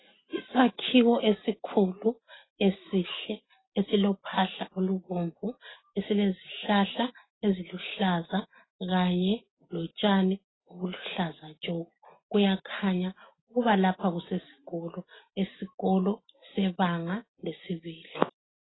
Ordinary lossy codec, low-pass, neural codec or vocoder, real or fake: AAC, 16 kbps; 7.2 kHz; none; real